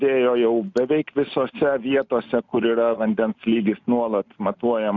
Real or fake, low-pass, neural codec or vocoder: real; 7.2 kHz; none